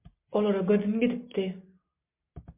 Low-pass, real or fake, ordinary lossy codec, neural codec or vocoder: 3.6 kHz; real; MP3, 24 kbps; none